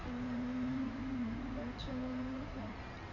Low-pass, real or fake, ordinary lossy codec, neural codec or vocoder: 7.2 kHz; fake; none; codec, 16 kHz in and 24 kHz out, 2.2 kbps, FireRedTTS-2 codec